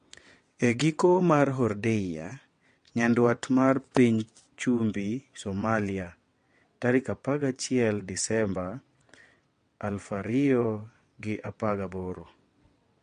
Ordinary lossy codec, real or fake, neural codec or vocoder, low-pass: MP3, 48 kbps; fake; vocoder, 22.05 kHz, 80 mel bands, WaveNeXt; 9.9 kHz